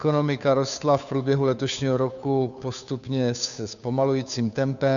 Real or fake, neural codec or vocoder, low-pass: fake; codec, 16 kHz, 2 kbps, FunCodec, trained on Chinese and English, 25 frames a second; 7.2 kHz